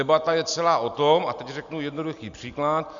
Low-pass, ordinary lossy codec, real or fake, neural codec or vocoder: 7.2 kHz; Opus, 64 kbps; real; none